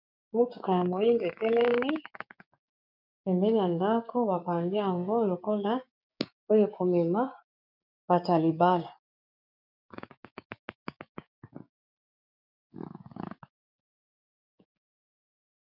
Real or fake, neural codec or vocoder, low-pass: fake; codec, 16 kHz, 6 kbps, DAC; 5.4 kHz